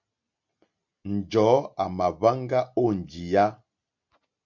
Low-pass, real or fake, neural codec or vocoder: 7.2 kHz; real; none